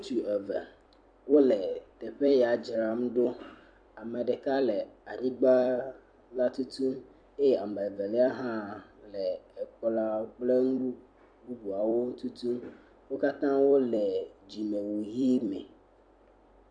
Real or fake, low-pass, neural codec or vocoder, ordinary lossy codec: fake; 9.9 kHz; vocoder, 44.1 kHz, 128 mel bands every 256 samples, BigVGAN v2; AAC, 64 kbps